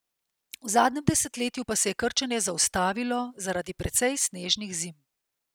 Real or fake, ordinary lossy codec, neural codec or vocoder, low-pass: real; none; none; none